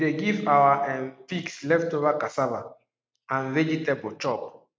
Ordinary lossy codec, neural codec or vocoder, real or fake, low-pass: none; none; real; none